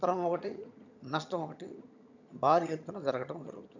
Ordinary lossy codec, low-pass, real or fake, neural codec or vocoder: none; 7.2 kHz; fake; vocoder, 22.05 kHz, 80 mel bands, HiFi-GAN